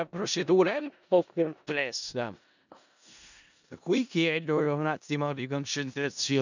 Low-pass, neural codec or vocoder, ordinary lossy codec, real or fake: 7.2 kHz; codec, 16 kHz in and 24 kHz out, 0.4 kbps, LongCat-Audio-Codec, four codebook decoder; none; fake